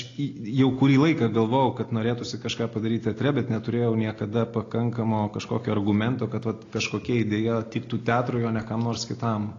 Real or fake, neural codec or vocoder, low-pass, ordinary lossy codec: real; none; 7.2 kHz; AAC, 32 kbps